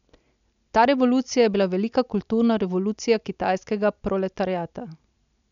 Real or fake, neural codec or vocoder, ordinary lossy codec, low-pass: real; none; none; 7.2 kHz